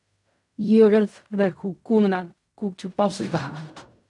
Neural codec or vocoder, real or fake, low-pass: codec, 16 kHz in and 24 kHz out, 0.4 kbps, LongCat-Audio-Codec, fine tuned four codebook decoder; fake; 10.8 kHz